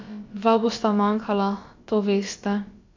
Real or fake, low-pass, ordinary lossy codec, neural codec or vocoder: fake; 7.2 kHz; AAC, 48 kbps; codec, 16 kHz, about 1 kbps, DyCAST, with the encoder's durations